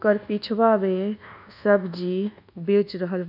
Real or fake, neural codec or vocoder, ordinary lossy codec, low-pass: fake; codec, 24 kHz, 1.2 kbps, DualCodec; none; 5.4 kHz